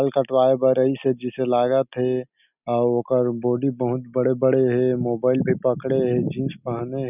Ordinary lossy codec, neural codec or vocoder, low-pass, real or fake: none; none; 3.6 kHz; real